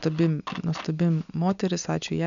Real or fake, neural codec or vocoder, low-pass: real; none; 7.2 kHz